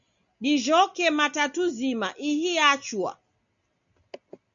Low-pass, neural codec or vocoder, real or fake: 7.2 kHz; none; real